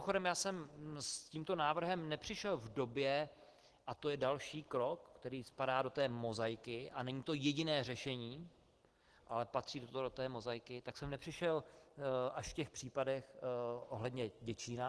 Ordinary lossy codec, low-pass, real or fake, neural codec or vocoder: Opus, 16 kbps; 10.8 kHz; real; none